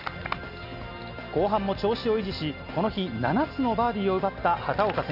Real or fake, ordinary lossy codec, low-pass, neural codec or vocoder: real; none; 5.4 kHz; none